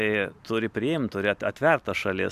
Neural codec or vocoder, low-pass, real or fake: none; 14.4 kHz; real